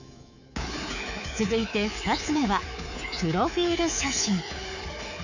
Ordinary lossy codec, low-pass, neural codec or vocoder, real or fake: none; 7.2 kHz; codec, 24 kHz, 3.1 kbps, DualCodec; fake